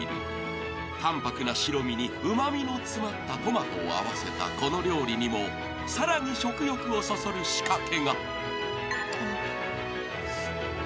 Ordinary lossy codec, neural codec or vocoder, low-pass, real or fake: none; none; none; real